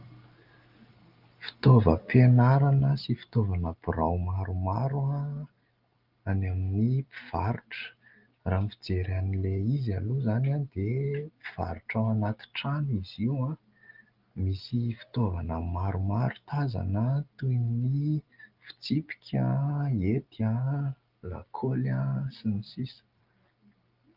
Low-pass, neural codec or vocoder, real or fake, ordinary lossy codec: 5.4 kHz; none; real; Opus, 32 kbps